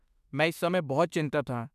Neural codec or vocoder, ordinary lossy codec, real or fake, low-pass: autoencoder, 48 kHz, 32 numbers a frame, DAC-VAE, trained on Japanese speech; none; fake; 14.4 kHz